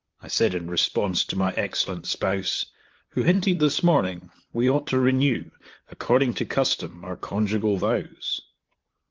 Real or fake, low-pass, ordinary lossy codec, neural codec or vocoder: fake; 7.2 kHz; Opus, 32 kbps; vocoder, 44.1 kHz, 128 mel bands, Pupu-Vocoder